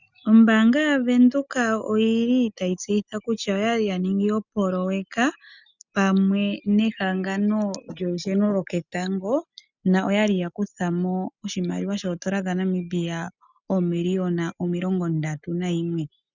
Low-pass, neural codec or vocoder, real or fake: 7.2 kHz; none; real